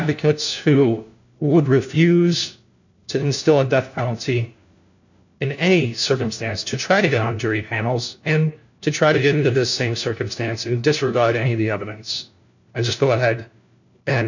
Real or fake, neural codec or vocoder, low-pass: fake; codec, 16 kHz, 1 kbps, FunCodec, trained on LibriTTS, 50 frames a second; 7.2 kHz